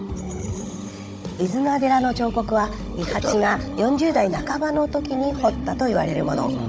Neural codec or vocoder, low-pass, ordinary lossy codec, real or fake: codec, 16 kHz, 16 kbps, FunCodec, trained on Chinese and English, 50 frames a second; none; none; fake